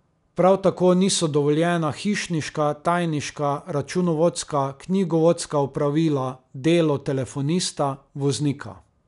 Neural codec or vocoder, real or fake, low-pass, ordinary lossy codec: none; real; 10.8 kHz; none